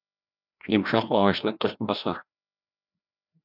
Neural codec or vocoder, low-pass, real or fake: codec, 16 kHz, 1 kbps, FreqCodec, larger model; 5.4 kHz; fake